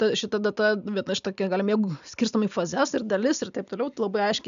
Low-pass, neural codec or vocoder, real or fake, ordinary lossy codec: 7.2 kHz; none; real; AAC, 96 kbps